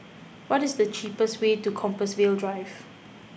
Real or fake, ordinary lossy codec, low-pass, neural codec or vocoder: real; none; none; none